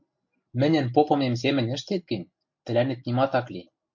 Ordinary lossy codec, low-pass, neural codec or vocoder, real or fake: MP3, 48 kbps; 7.2 kHz; none; real